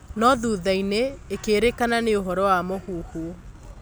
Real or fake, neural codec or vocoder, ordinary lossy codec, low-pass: real; none; none; none